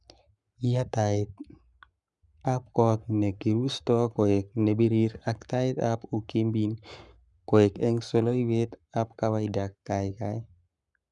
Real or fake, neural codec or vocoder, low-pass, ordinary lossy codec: fake; codec, 44.1 kHz, 7.8 kbps, Pupu-Codec; 10.8 kHz; none